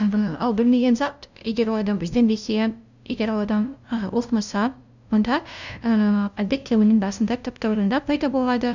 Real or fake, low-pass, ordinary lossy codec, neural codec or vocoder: fake; 7.2 kHz; none; codec, 16 kHz, 0.5 kbps, FunCodec, trained on LibriTTS, 25 frames a second